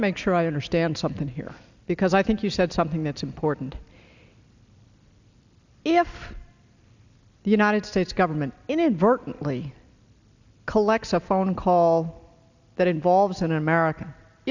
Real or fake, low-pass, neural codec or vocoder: real; 7.2 kHz; none